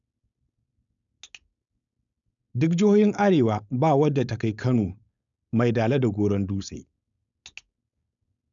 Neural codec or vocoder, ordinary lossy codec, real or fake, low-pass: codec, 16 kHz, 4.8 kbps, FACodec; none; fake; 7.2 kHz